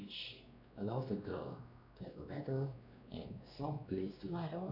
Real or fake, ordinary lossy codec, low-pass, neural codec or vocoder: fake; none; 5.4 kHz; codec, 16 kHz, 2 kbps, X-Codec, WavLM features, trained on Multilingual LibriSpeech